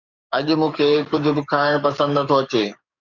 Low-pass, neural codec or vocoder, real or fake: 7.2 kHz; codec, 44.1 kHz, 7.8 kbps, DAC; fake